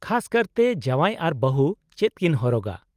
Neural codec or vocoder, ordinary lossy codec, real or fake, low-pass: none; Opus, 32 kbps; real; 14.4 kHz